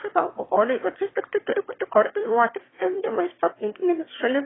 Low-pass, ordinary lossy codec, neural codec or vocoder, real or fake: 7.2 kHz; AAC, 16 kbps; autoencoder, 22.05 kHz, a latent of 192 numbers a frame, VITS, trained on one speaker; fake